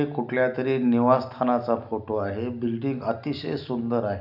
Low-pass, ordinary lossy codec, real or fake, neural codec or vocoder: 5.4 kHz; none; real; none